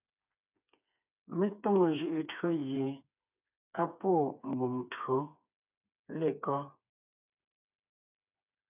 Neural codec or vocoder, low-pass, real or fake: codec, 16 kHz, 4 kbps, FreqCodec, smaller model; 3.6 kHz; fake